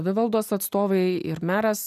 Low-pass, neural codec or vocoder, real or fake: 14.4 kHz; none; real